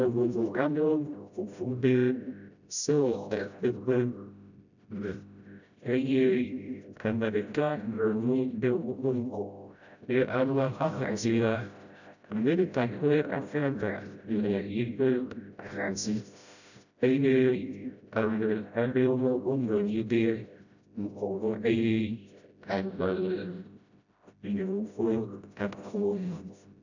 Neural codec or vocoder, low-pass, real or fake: codec, 16 kHz, 0.5 kbps, FreqCodec, smaller model; 7.2 kHz; fake